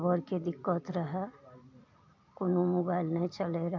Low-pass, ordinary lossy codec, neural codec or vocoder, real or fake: 7.2 kHz; MP3, 48 kbps; none; real